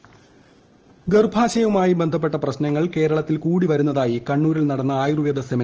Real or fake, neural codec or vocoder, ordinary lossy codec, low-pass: real; none; Opus, 16 kbps; 7.2 kHz